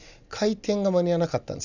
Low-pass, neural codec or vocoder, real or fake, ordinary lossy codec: 7.2 kHz; none; real; none